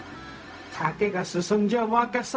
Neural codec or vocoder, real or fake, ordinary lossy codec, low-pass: codec, 16 kHz, 0.4 kbps, LongCat-Audio-Codec; fake; none; none